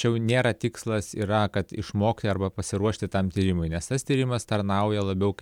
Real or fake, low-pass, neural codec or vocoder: real; 19.8 kHz; none